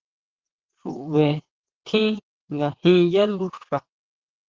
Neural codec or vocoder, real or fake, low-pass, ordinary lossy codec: vocoder, 22.05 kHz, 80 mel bands, WaveNeXt; fake; 7.2 kHz; Opus, 16 kbps